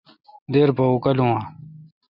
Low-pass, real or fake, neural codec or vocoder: 5.4 kHz; real; none